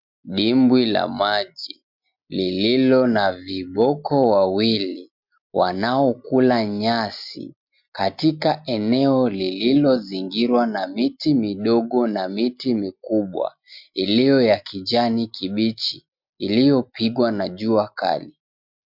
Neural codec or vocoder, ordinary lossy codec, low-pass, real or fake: none; MP3, 48 kbps; 5.4 kHz; real